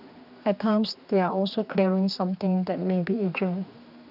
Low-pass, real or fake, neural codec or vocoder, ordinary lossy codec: 5.4 kHz; fake; codec, 16 kHz, 2 kbps, X-Codec, HuBERT features, trained on general audio; none